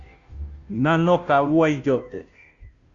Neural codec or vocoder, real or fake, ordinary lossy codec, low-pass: codec, 16 kHz, 0.5 kbps, FunCodec, trained on Chinese and English, 25 frames a second; fake; Opus, 64 kbps; 7.2 kHz